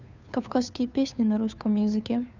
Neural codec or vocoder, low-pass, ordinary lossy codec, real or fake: codec, 16 kHz, 2 kbps, FunCodec, trained on Chinese and English, 25 frames a second; 7.2 kHz; none; fake